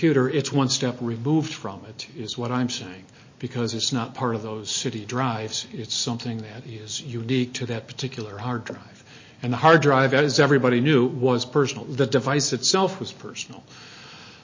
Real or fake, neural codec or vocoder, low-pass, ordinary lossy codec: real; none; 7.2 kHz; MP3, 32 kbps